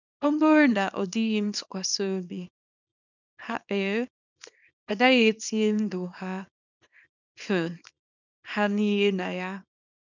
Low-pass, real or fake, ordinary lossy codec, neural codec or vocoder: 7.2 kHz; fake; none; codec, 24 kHz, 0.9 kbps, WavTokenizer, small release